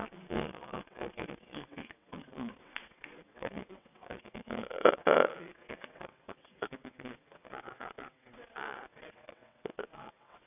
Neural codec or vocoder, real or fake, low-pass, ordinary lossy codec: vocoder, 22.05 kHz, 80 mel bands, WaveNeXt; fake; 3.6 kHz; none